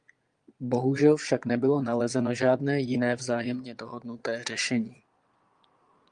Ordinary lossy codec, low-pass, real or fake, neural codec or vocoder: Opus, 32 kbps; 9.9 kHz; fake; vocoder, 22.05 kHz, 80 mel bands, Vocos